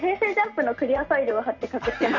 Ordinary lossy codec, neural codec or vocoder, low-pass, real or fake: none; none; 7.2 kHz; real